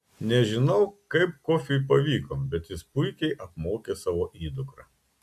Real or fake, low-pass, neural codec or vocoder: real; 14.4 kHz; none